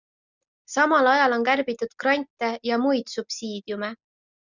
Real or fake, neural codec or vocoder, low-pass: real; none; 7.2 kHz